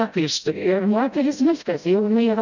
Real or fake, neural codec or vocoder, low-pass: fake; codec, 16 kHz, 0.5 kbps, FreqCodec, smaller model; 7.2 kHz